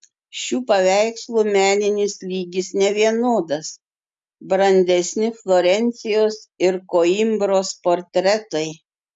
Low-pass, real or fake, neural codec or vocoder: 10.8 kHz; real; none